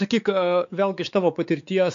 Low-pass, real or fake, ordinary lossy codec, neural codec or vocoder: 7.2 kHz; fake; AAC, 64 kbps; codec, 16 kHz, 4 kbps, X-Codec, WavLM features, trained on Multilingual LibriSpeech